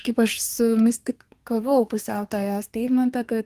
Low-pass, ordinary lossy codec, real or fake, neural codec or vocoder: 14.4 kHz; Opus, 24 kbps; fake; codec, 32 kHz, 1.9 kbps, SNAC